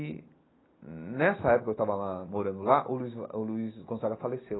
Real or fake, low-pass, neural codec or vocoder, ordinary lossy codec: real; 7.2 kHz; none; AAC, 16 kbps